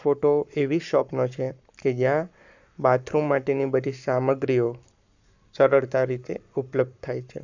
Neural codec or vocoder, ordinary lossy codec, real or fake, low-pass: codec, 44.1 kHz, 7.8 kbps, Pupu-Codec; none; fake; 7.2 kHz